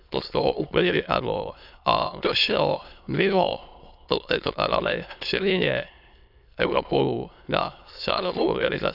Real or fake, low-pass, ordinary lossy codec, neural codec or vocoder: fake; 5.4 kHz; none; autoencoder, 22.05 kHz, a latent of 192 numbers a frame, VITS, trained on many speakers